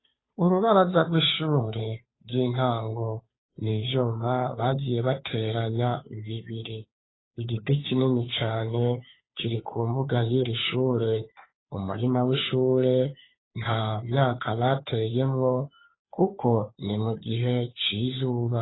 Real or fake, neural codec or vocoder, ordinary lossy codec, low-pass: fake; codec, 16 kHz, 2 kbps, FunCodec, trained on Chinese and English, 25 frames a second; AAC, 16 kbps; 7.2 kHz